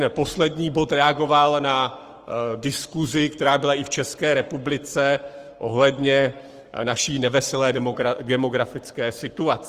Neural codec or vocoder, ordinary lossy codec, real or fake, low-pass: codec, 44.1 kHz, 7.8 kbps, Pupu-Codec; Opus, 24 kbps; fake; 14.4 kHz